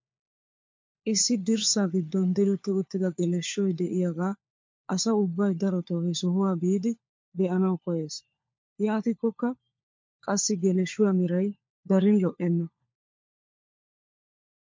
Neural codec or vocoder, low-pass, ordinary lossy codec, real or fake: codec, 16 kHz, 4 kbps, FunCodec, trained on LibriTTS, 50 frames a second; 7.2 kHz; MP3, 48 kbps; fake